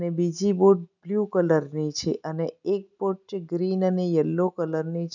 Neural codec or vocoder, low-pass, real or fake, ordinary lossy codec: none; 7.2 kHz; real; none